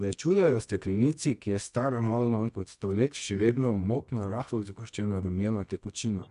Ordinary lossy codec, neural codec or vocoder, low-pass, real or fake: none; codec, 24 kHz, 0.9 kbps, WavTokenizer, medium music audio release; 10.8 kHz; fake